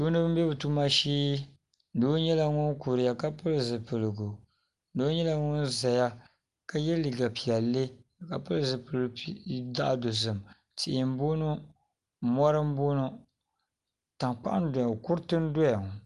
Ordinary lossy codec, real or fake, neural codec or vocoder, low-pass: Opus, 32 kbps; real; none; 10.8 kHz